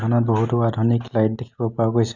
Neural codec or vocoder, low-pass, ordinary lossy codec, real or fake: none; 7.2 kHz; none; real